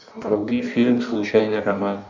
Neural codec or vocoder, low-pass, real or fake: codec, 16 kHz in and 24 kHz out, 1.1 kbps, FireRedTTS-2 codec; 7.2 kHz; fake